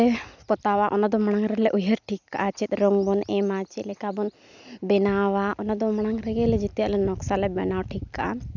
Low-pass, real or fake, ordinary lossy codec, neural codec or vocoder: 7.2 kHz; real; none; none